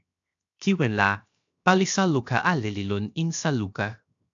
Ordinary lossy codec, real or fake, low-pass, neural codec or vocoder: AAC, 64 kbps; fake; 7.2 kHz; codec, 16 kHz, 0.7 kbps, FocalCodec